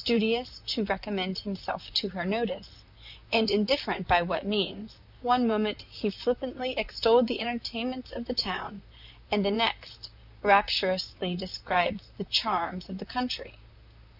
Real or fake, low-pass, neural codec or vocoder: fake; 5.4 kHz; vocoder, 44.1 kHz, 128 mel bands, Pupu-Vocoder